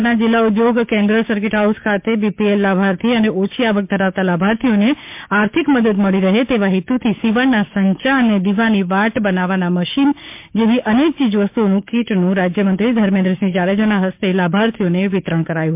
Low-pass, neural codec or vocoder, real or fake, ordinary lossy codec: 3.6 kHz; codec, 16 kHz, 6 kbps, DAC; fake; MP3, 32 kbps